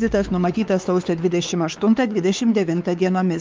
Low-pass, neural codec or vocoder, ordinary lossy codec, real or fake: 7.2 kHz; codec, 16 kHz, 4 kbps, X-Codec, WavLM features, trained on Multilingual LibriSpeech; Opus, 24 kbps; fake